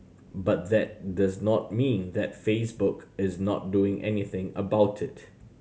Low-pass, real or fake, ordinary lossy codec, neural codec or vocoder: none; real; none; none